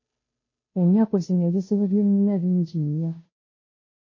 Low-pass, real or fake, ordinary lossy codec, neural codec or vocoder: 7.2 kHz; fake; MP3, 32 kbps; codec, 16 kHz, 0.5 kbps, FunCodec, trained on Chinese and English, 25 frames a second